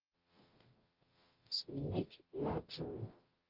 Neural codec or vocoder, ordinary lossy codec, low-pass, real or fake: codec, 44.1 kHz, 0.9 kbps, DAC; Opus, 32 kbps; 5.4 kHz; fake